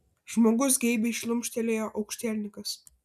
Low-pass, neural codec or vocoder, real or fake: 14.4 kHz; none; real